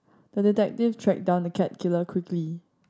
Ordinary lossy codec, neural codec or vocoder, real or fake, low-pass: none; none; real; none